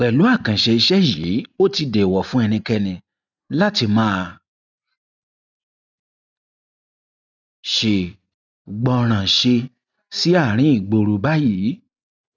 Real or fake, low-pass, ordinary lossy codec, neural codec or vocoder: real; 7.2 kHz; none; none